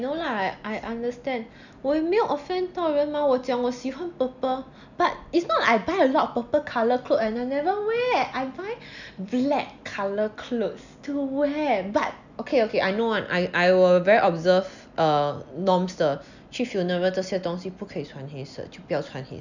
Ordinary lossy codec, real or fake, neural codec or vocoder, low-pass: none; real; none; 7.2 kHz